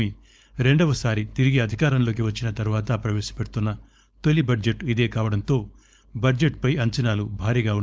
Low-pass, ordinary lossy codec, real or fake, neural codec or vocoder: none; none; fake; codec, 16 kHz, 4.8 kbps, FACodec